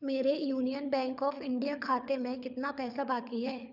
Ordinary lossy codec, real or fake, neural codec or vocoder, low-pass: none; fake; codec, 24 kHz, 6 kbps, HILCodec; 5.4 kHz